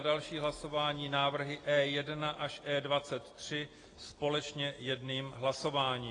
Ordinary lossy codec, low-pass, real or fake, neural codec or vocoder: AAC, 32 kbps; 9.9 kHz; real; none